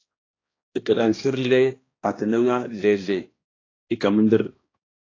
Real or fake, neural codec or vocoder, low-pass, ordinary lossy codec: fake; codec, 16 kHz, 1 kbps, X-Codec, HuBERT features, trained on balanced general audio; 7.2 kHz; AAC, 32 kbps